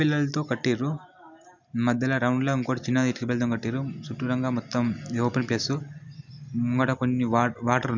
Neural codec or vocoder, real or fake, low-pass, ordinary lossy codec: none; real; 7.2 kHz; none